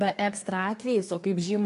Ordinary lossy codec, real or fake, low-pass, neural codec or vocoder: AAC, 48 kbps; fake; 10.8 kHz; codec, 24 kHz, 1 kbps, SNAC